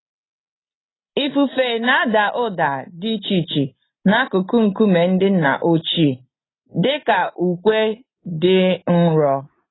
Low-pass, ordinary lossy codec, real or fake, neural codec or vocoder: 7.2 kHz; AAC, 16 kbps; real; none